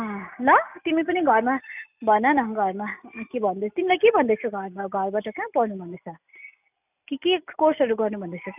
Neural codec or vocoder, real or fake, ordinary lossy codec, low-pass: none; real; none; 3.6 kHz